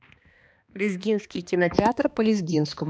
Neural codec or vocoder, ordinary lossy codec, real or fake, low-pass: codec, 16 kHz, 2 kbps, X-Codec, HuBERT features, trained on balanced general audio; none; fake; none